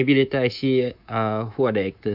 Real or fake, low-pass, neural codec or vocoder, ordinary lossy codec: fake; 5.4 kHz; codec, 24 kHz, 3.1 kbps, DualCodec; none